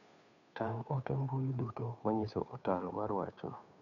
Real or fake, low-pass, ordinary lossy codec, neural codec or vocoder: fake; 7.2 kHz; none; codec, 16 kHz, 2 kbps, FunCodec, trained on Chinese and English, 25 frames a second